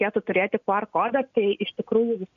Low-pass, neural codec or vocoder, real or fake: 7.2 kHz; none; real